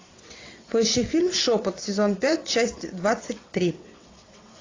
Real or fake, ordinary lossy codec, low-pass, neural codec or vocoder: fake; AAC, 32 kbps; 7.2 kHz; vocoder, 22.05 kHz, 80 mel bands, WaveNeXt